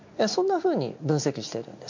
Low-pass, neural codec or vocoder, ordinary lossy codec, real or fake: 7.2 kHz; none; MP3, 48 kbps; real